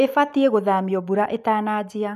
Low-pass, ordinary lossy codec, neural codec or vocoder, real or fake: 14.4 kHz; none; none; real